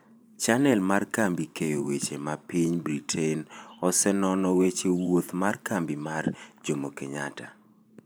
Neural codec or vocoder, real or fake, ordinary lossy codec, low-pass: vocoder, 44.1 kHz, 128 mel bands every 512 samples, BigVGAN v2; fake; none; none